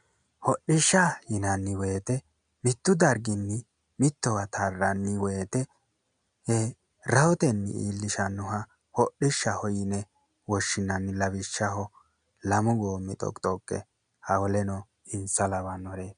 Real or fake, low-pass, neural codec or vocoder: real; 9.9 kHz; none